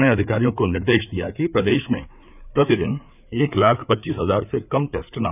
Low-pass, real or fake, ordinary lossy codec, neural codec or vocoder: 3.6 kHz; fake; none; codec, 16 kHz, 4 kbps, FreqCodec, larger model